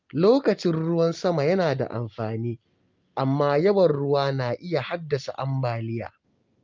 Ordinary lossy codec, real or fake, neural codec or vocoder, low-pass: Opus, 24 kbps; real; none; 7.2 kHz